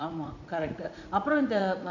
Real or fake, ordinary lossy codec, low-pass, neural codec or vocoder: fake; none; 7.2 kHz; vocoder, 44.1 kHz, 80 mel bands, Vocos